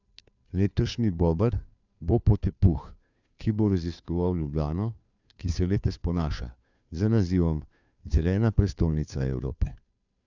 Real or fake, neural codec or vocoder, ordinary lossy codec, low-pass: fake; codec, 16 kHz, 2 kbps, FunCodec, trained on Chinese and English, 25 frames a second; none; 7.2 kHz